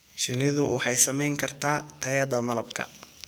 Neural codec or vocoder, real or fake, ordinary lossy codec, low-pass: codec, 44.1 kHz, 2.6 kbps, SNAC; fake; none; none